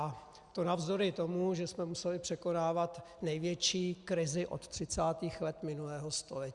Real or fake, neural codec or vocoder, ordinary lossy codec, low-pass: real; none; Opus, 64 kbps; 10.8 kHz